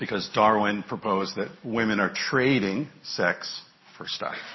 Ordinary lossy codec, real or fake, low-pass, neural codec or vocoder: MP3, 24 kbps; real; 7.2 kHz; none